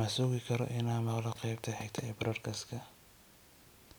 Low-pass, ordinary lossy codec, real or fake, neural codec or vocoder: none; none; real; none